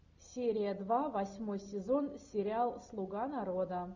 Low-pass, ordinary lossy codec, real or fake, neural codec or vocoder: 7.2 kHz; Opus, 64 kbps; real; none